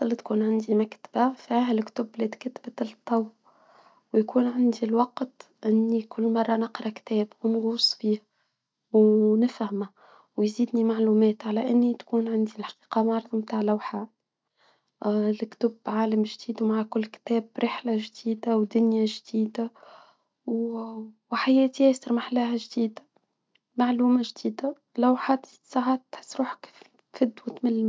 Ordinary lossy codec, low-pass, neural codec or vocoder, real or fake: none; none; none; real